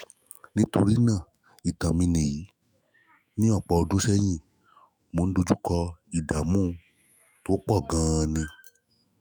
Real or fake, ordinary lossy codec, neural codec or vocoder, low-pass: fake; none; autoencoder, 48 kHz, 128 numbers a frame, DAC-VAE, trained on Japanese speech; none